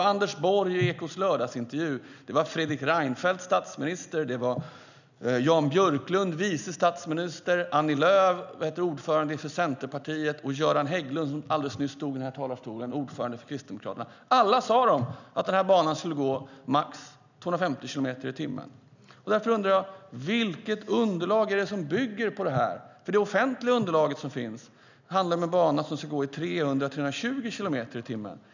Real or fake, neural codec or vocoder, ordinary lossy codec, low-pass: real; none; none; 7.2 kHz